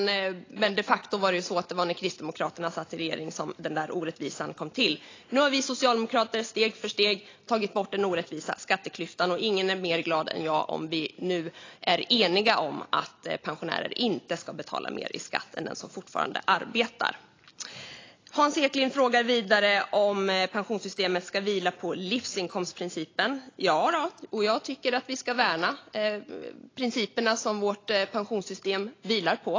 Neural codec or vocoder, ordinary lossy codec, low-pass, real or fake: none; AAC, 32 kbps; 7.2 kHz; real